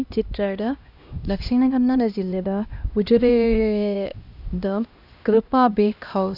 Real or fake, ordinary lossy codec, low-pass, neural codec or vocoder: fake; none; 5.4 kHz; codec, 16 kHz, 1 kbps, X-Codec, HuBERT features, trained on LibriSpeech